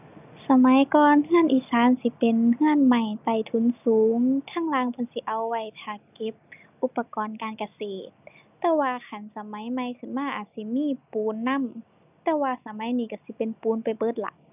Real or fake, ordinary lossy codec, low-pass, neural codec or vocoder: real; none; 3.6 kHz; none